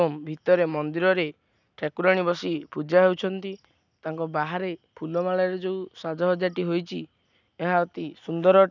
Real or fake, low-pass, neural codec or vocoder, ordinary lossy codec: real; 7.2 kHz; none; none